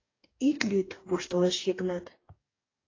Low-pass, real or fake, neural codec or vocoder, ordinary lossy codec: 7.2 kHz; fake; codec, 44.1 kHz, 2.6 kbps, DAC; AAC, 32 kbps